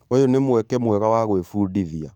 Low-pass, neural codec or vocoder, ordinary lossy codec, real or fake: 19.8 kHz; codec, 44.1 kHz, 7.8 kbps, DAC; none; fake